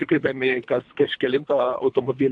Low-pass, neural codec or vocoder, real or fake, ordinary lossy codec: 9.9 kHz; codec, 24 kHz, 3 kbps, HILCodec; fake; AAC, 64 kbps